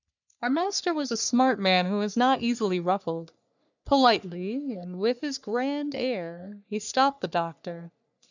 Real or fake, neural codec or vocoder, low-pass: fake; codec, 44.1 kHz, 3.4 kbps, Pupu-Codec; 7.2 kHz